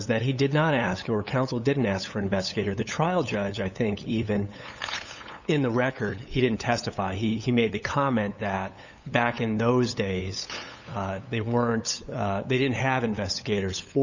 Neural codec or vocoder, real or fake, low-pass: codec, 16 kHz, 8 kbps, FunCodec, trained on LibriTTS, 25 frames a second; fake; 7.2 kHz